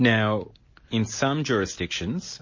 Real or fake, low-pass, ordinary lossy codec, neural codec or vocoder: real; 7.2 kHz; MP3, 32 kbps; none